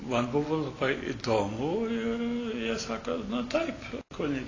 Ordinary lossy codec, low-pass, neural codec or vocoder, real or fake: AAC, 32 kbps; 7.2 kHz; none; real